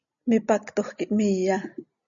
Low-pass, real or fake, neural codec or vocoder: 7.2 kHz; real; none